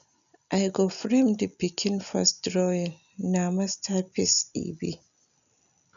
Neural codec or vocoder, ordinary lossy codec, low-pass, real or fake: none; none; 7.2 kHz; real